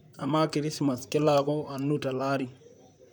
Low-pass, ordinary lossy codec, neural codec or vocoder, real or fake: none; none; vocoder, 44.1 kHz, 128 mel bands, Pupu-Vocoder; fake